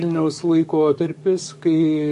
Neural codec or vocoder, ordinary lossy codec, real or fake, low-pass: codec, 44.1 kHz, 7.8 kbps, DAC; MP3, 48 kbps; fake; 14.4 kHz